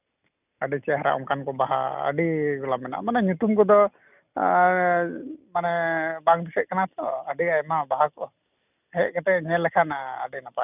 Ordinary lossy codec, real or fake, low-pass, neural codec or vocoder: none; real; 3.6 kHz; none